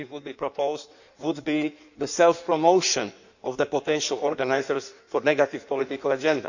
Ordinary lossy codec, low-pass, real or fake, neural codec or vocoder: none; 7.2 kHz; fake; codec, 16 kHz in and 24 kHz out, 1.1 kbps, FireRedTTS-2 codec